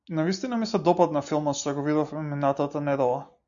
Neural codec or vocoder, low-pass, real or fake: none; 7.2 kHz; real